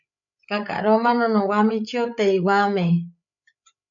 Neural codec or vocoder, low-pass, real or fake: codec, 16 kHz, 8 kbps, FreqCodec, larger model; 7.2 kHz; fake